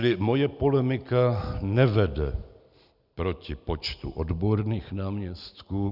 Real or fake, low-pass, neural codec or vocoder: real; 5.4 kHz; none